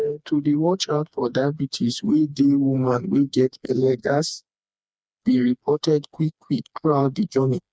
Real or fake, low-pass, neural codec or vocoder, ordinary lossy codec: fake; none; codec, 16 kHz, 2 kbps, FreqCodec, smaller model; none